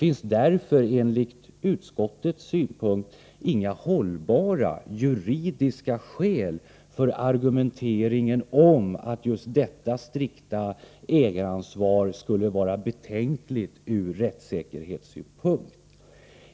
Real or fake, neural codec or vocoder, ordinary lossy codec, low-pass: real; none; none; none